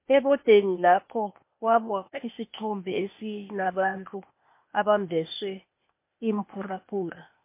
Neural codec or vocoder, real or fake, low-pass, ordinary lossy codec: codec, 16 kHz, 0.8 kbps, ZipCodec; fake; 3.6 kHz; MP3, 24 kbps